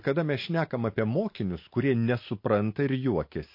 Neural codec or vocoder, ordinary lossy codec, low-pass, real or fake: none; MP3, 32 kbps; 5.4 kHz; real